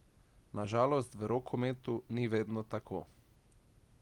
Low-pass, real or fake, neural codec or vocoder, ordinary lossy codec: 19.8 kHz; real; none; Opus, 24 kbps